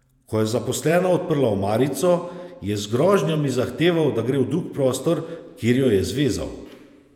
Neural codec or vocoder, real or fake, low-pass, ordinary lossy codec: vocoder, 48 kHz, 128 mel bands, Vocos; fake; 19.8 kHz; none